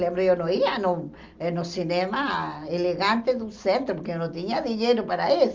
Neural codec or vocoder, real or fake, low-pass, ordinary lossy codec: none; real; 7.2 kHz; Opus, 32 kbps